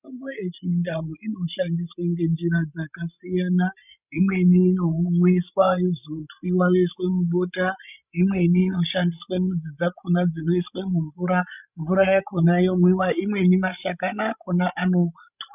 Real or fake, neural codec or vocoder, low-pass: fake; codec, 16 kHz, 16 kbps, FreqCodec, larger model; 3.6 kHz